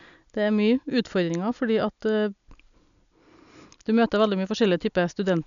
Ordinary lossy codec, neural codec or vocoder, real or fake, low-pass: none; none; real; 7.2 kHz